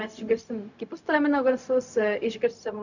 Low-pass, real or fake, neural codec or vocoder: 7.2 kHz; fake; codec, 16 kHz, 0.4 kbps, LongCat-Audio-Codec